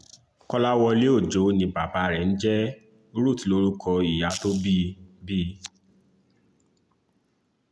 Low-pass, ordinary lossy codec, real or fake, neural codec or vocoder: none; none; real; none